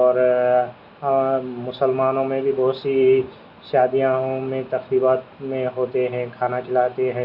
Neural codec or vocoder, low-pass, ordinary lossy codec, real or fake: none; 5.4 kHz; Opus, 64 kbps; real